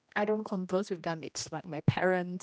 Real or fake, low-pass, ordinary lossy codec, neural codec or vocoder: fake; none; none; codec, 16 kHz, 1 kbps, X-Codec, HuBERT features, trained on general audio